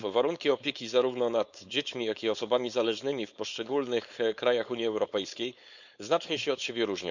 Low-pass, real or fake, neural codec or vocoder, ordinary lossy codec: 7.2 kHz; fake; codec, 16 kHz, 4.8 kbps, FACodec; none